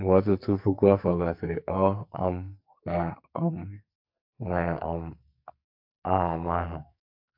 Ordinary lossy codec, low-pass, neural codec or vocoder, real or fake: AAC, 48 kbps; 5.4 kHz; codec, 44.1 kHz, 2.6 kbps, SNAC; fake